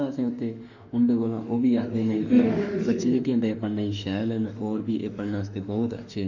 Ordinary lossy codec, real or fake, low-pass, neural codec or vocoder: none; fake; 7.2 kHz; autoencoder, 48 kHz, 32 numbers a frame, DAC-VAE, trained on Japanese speech